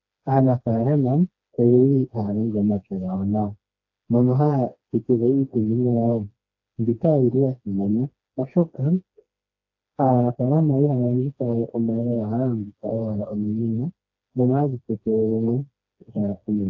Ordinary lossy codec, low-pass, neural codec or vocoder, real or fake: AAC, 48 kbps; 7.2 kHz; codec, 16 kHz, 2 kbps, FreqCodec, smaller model; fake